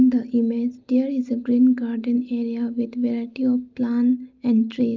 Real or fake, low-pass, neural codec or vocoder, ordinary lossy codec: real; 7.2 kHz; none; Opus, 24 kbps